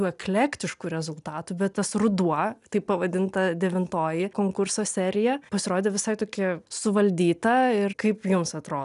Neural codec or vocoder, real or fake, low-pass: none; real; 10.8 kHz